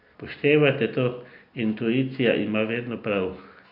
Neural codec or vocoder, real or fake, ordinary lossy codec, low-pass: none; real; AAC, 48 kbps; 5.4 kHz